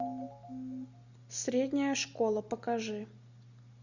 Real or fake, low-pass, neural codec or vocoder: real; 7.2 kHz; none